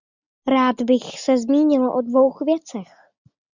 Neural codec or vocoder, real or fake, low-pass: none; real; 7.2 kHz